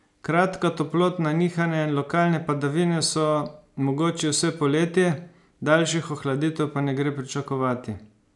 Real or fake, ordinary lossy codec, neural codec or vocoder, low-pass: real; none; none; 10.8 kHz